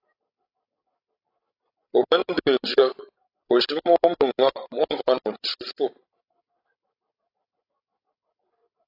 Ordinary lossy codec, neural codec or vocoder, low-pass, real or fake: AAC, 32 kbps; codec, 16 kHz, 16 kbps, FreqCodec, larger model; 5.4 kHz; fake